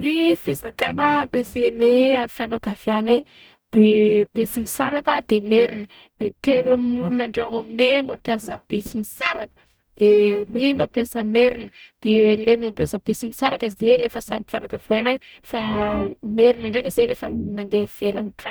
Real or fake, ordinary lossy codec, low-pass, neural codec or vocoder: fake; none; none; codec, 44.1 kHz, 0.9 kbps, DAC